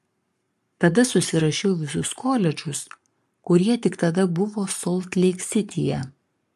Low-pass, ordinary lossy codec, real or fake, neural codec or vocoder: 14.4 kHz; MP3, 64 kbps; fake; codec, 44.1 kHz, 7.8 kbps, Pupu-Codec